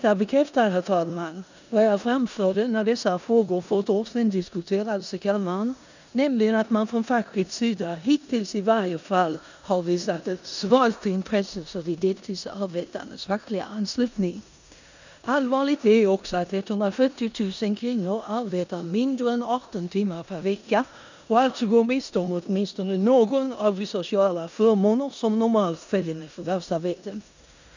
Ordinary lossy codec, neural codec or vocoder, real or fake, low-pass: none; codec, 16 kHz in and 24 kHz out, 0.9 kbps, LongCat-Audio-Codec, four codebook decoder; fake; 7.2 kHz